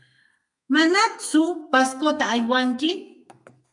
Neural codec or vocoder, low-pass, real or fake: codec, 32 kHz, 1.9 kbps, SNAC; 10.8 kHz; fake